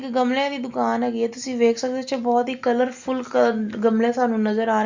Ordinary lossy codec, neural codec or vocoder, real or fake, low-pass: Opus, 64 kbps; none; real; 7.2 kHz